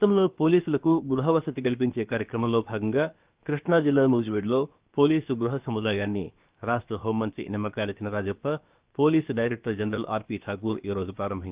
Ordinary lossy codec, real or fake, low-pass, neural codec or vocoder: Opus, 32 kbps; fake; 3.6 kHz; codec, 16 kHz, about 1 kbps, DyCAST, with the encoder's durations